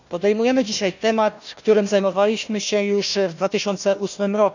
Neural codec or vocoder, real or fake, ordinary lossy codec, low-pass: codec, 16 kHz, 1 kbps, FunCodec, trained on Chinese and English, 50 frames a second; fake; none; 7.2 kHz